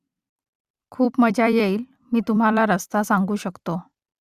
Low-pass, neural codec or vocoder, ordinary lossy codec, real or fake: 14.4 kHz; vocoder, 44.1 kHz, 128 mel bands every 256 samples, BigVGAN v2; Opus, 64 kbps; fake